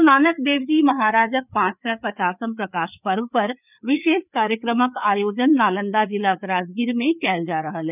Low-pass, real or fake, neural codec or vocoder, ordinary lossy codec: 3.6 kHz; fake; codec, 16 kHz, 4 kbps, FreqCodec, larger model; none